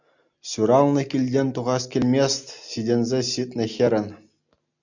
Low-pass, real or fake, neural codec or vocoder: 7.2 kHz; real; none